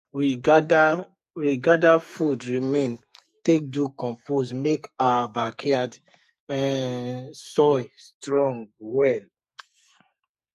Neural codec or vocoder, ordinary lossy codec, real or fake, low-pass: codec, 44.1 kHz, 2.6 kbps, SNAC; MP3, 64 kbps; fake; 14.4 kHz